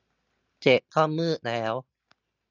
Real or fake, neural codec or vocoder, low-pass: real; none; 7.2 kHz